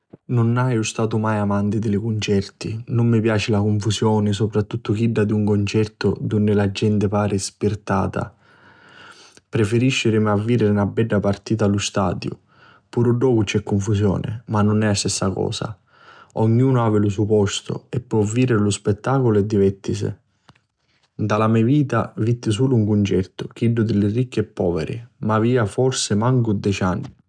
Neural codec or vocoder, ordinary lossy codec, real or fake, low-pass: none; none; real; 9.9 kHz